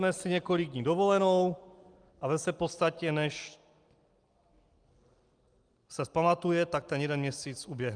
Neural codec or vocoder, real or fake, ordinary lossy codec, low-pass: none; real; Opus, 32 kbps; 9.9 kHz